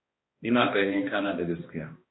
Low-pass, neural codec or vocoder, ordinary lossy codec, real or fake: 7.2 kHz; codec, 16 kHz, 1 kbps, X-Codec, HuBERT features, trained on balanced general audio; AAC, 16 kbps; fake